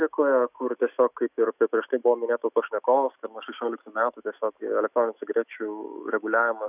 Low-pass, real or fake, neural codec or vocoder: 3.6 kHz; fake; autoencoder, 48 kHz, 128 numbers a frame, DAC-VAE, trained on Japanese speech